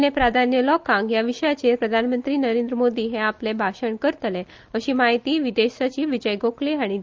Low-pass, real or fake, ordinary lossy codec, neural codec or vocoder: 7.2 kHz; real; Opus, 32 kbps; none